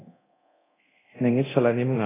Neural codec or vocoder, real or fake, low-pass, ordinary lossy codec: codec, 24 kHz, 0.9 kbps, DualCodec; fake; 3.6 kHz; AAC, 16 kbps